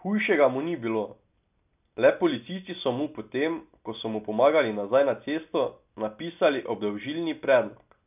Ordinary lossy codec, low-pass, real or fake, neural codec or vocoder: none; 3.6 kHz; real; none